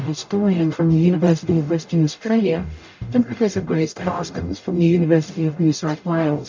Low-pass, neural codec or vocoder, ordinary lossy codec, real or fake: 7.2 kHz; codec, 44.1 kHz, 0.9 kbps, DAC; MP3, 64 kbps; fake